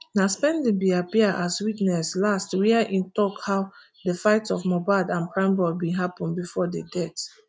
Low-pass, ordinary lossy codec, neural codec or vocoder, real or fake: none; none; none; real